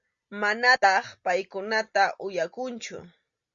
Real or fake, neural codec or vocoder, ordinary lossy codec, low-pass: real; none; Opus, 64 kbps; 7.2 kHz